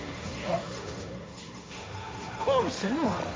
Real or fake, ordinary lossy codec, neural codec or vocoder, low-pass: fake; none; codec, 16 kHz, 1.1 kbps, Voila-Tokenizer; none